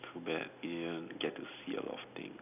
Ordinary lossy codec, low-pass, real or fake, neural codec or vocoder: none; 3.6 kHz; real; none